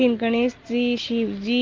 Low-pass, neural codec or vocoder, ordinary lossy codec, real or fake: 7.2 kHz; none; Opus, 16 kbps; real